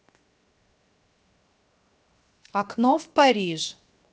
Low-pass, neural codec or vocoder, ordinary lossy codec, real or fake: none; codec, 16 kHz, 0.7 kbps, FocalCodec; none; fake